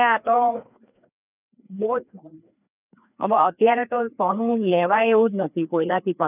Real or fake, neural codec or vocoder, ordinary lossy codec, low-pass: fake; codec, 16 kHz, 2 kbps, FreqCodec, larger model; none; 3.6 kHz